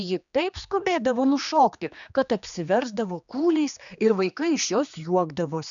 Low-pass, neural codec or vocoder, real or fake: 7.2 kHz; codec, 16 kHz, 2 kbps, X-Codec, HuBERT features, trained on general audio; fake